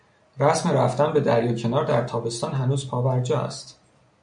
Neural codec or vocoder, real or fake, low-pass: none; real; 9.9 kHz